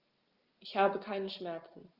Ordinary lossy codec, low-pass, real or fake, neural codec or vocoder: Opus, 32 kbps; 5.4 kHz; real; none